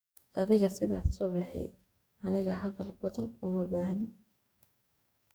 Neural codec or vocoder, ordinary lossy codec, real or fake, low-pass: codec, 44.1 kHz, 2.6 kbps, DAC; none; fake; none